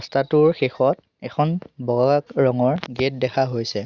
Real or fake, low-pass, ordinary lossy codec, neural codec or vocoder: real; 7.2 kHz; Opus, 64 kbps; none